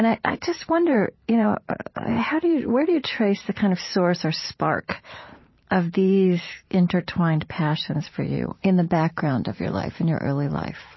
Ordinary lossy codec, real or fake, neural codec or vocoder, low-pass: MP3, 24 kbps; fake; vocoder, 44.1 kHz, 128 mel bands every 512 samples, BigVGAN v2; 7.2 kHz